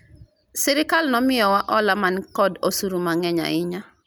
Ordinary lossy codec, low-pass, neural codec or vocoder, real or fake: none; none; none; real